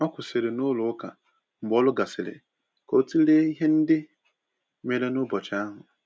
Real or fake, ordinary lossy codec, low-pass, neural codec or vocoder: real; none; none; none